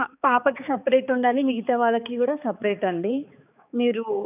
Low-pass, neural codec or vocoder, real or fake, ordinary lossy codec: 3.6 kHz; codec, 16 kHz, 4 kbps, X-Codec, HuBERT features, trained on balanced general audio; fake; none